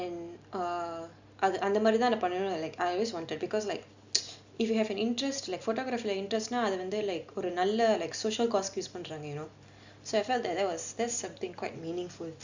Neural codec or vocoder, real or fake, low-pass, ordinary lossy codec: none; real; 7.2 kHz; Opus, 64 kbps